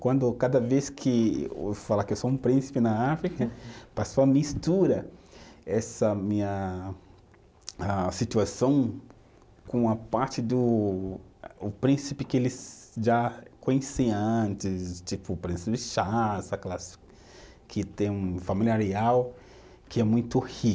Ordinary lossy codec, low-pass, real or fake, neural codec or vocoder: none; none; real; none